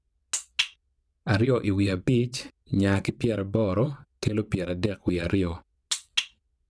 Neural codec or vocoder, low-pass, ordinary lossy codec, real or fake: vocoder, 22.05 kHz, 80 mel bands, WaveNeXt; none; none; fake